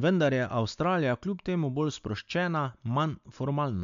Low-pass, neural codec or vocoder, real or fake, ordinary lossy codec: 7.2 kHz; none; real; MP3, 64 kbps